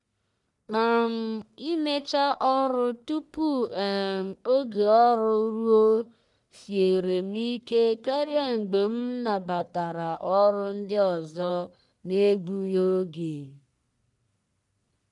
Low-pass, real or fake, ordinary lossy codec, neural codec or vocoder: 10.8 kHz; fake; none; codec, 44.1 kHz, 1.7 kbps, Pupu-Codec